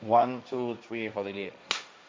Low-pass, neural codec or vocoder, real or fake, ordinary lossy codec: none; codec, 16 kHz, 1.1 kbps, Voila-Tokenizer; fake; none